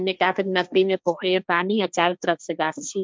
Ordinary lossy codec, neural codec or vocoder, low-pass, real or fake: none; codec, 16 kHz, 1.1 kbps, Voila-Tokenizer; none; fake